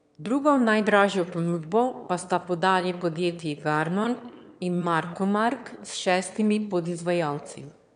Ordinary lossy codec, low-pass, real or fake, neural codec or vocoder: AAC, 96 kbps; 9.9 kHz; fake; autoencoder, 22.05 kHz, a latent of 192 numbers a frame, VITS, trained on one speaker